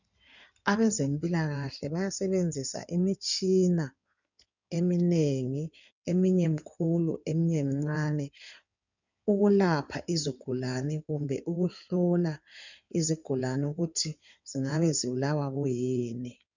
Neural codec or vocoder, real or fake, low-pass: codec, 16 kHz in and 24 kHz out, 2.2 kbps, FireRedTTS-2 codec; fake; 7.2 kHz